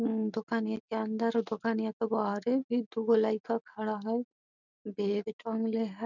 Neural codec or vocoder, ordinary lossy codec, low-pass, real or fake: none; none; 7.2 kHz; real